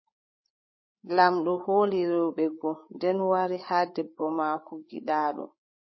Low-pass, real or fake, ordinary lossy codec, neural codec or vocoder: 7.2 kHz; fake; MP3, 24 kbps; codec, 16 kHz, 8 kbps, FreqCodec, larger model